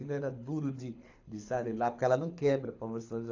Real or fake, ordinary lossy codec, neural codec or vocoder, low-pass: fake; AAC, 48 kbps; codec, 24 kHz, 6 kbps, HILCodec; 7.2 kHz